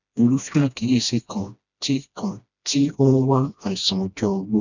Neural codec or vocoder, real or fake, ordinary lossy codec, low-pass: codec, 16 kHz, 1 kbps, FreqCodec, smaller model; fake; none; 7.2 kHz